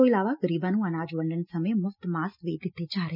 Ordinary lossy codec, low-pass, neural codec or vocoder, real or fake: none; 5.4 kHz; none; real